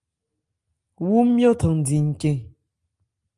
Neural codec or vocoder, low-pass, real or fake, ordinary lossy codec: none; 10.8 kHz; real; Opus, 32 kbps